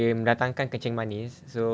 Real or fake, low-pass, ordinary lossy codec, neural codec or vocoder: real; none; none; none